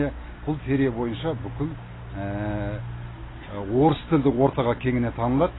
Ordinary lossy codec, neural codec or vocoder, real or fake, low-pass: AAC, 16 kbps; none; real; 7.2 kHz